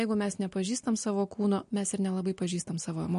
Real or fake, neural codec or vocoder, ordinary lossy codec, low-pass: real; none; MP3, 48 kbps; 10.8 kHz